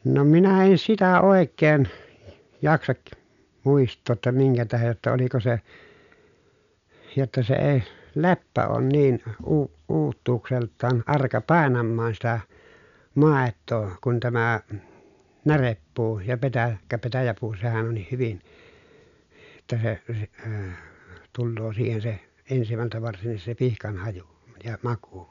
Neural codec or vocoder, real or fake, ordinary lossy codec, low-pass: none; real; none; 7.2 kHz